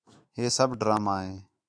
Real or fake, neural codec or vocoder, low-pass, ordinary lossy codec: fake; autoencoder, 48 kHz, 128 numbers a frame, DAC-VAE, trained on Japanese speech; 9.9 kHz; AAC, 64 kbps